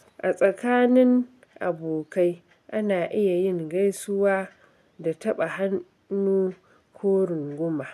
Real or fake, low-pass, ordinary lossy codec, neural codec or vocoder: real; 14.4 kHz; none; none